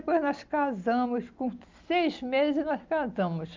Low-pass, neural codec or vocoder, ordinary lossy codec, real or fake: 7.2 kHz; none; Opus, 24 kbps; real